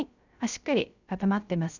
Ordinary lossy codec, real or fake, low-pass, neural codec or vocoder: none; fake; 7.2 kHz; codec, 16 kHz, 0.3 kbps, FocalCodec